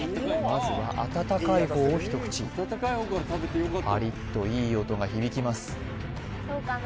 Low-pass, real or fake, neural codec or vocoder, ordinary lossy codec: none; real; none; none